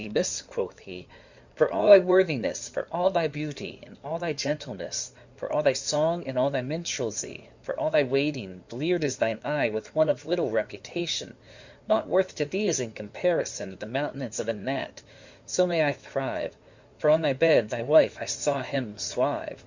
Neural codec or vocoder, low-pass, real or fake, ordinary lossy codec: codec, 16 kHz in and 24 kHz out, 2.2 kbps, FireRedTTS-2 codec; 7.2 kHz; fake; Opus, 64 kbps